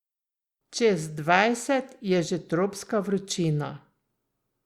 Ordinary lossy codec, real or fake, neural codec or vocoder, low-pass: Opus, 64 kbps; real; none; 19.8 kHz